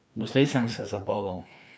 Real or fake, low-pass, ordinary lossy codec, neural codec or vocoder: fake; none; none; codec, 16 kHz, 2 kbps, FreqCodec, larger model